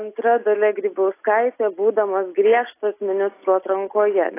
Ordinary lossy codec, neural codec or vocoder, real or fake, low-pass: AAC, 24 kbps; none; real; 3.6 kHz